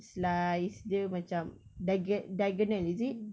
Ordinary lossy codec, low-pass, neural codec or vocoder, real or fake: none; none; none; real